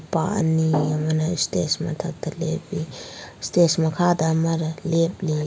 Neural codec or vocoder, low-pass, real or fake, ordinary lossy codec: none; none; real; none